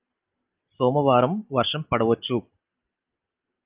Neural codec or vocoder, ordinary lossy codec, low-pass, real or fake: none; Opus, 24 kbps; 3.6 kHz; real